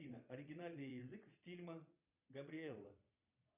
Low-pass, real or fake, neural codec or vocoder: 3.6 kHz; fake; vocoder, 22.05 kHz, 80 mel bands, Vocos